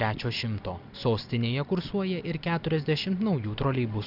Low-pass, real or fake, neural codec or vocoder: 5.4 kHz; real; none